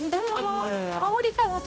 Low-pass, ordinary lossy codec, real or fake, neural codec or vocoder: none; none; fake; codec, 16 kHz, 0.5 kbps, X-Codec, HuBERT features, trained on balanced general audio